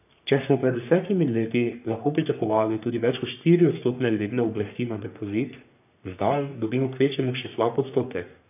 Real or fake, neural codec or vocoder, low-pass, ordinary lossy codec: fake; codec, 44.1 kHz, 3.4 kbps, Pupu-Codec; 3.6 kHz; none